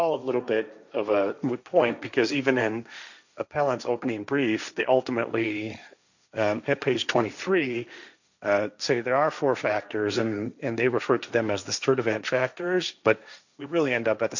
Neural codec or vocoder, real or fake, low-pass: codec, 16 kHz, 1.1 kbps, Voila-Tokenizer; fake; 7.2 kHz